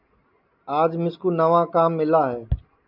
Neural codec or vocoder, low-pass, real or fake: none; 5.4 kHz; real